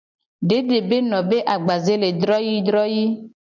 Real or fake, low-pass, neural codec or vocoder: real; 7.2 kHz; none